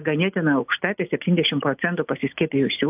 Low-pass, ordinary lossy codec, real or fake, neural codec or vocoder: 3.6 kHz; AAC, 32 kbps; real; none